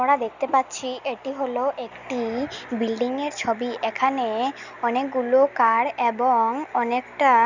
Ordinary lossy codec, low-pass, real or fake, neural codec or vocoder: none; 7.2 kHz; real; none